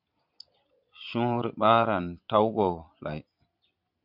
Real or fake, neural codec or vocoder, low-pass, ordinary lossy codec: fake; vocoder, 22.05 kHz, 80 mel bands, Vocos; 5.4 kHz; MP3, 48 kbps